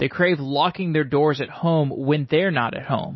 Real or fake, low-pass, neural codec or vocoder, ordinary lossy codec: real; 7.2 kHz; none; MP3, 24 kbps